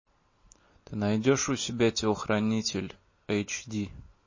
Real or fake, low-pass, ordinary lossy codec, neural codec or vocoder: real; 7.2 kHz; MP3, 32 kbps; none